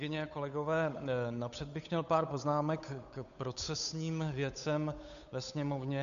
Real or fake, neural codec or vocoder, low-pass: fake; codec, 16 kHz, 8 kbps, FunCodec, trained on Chinese and English, 25 frames a second; 7.2 kHz